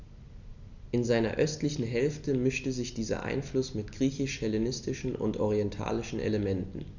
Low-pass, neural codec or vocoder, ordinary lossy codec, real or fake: 7.2 kHz; none; none; real